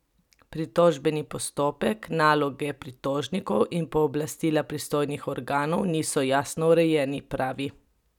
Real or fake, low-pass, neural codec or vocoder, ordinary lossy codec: real; 19.8 kHz; none; none